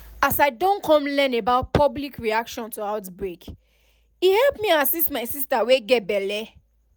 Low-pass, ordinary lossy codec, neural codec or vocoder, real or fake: none; none; none; real